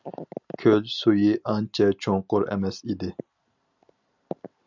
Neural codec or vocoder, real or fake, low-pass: none; real; 7.2 kHz